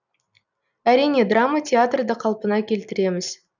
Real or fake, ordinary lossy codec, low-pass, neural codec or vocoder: real; none; 7.2 kHz; none